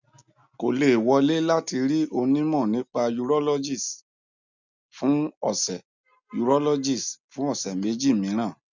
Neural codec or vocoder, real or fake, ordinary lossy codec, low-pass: none; real; none; 7.2 kHz